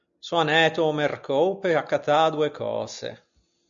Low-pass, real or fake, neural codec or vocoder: 7.2 kHz; real; none